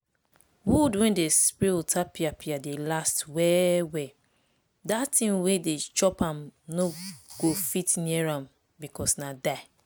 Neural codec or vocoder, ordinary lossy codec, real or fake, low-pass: none; none; real; none